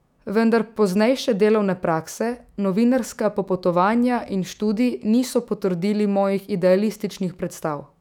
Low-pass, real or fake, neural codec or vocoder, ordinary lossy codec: 19.8 kHz; fake; autoencoder, 48 kHz, 128 numbers a frame, DAC-VAE, trained on Japanese speech; none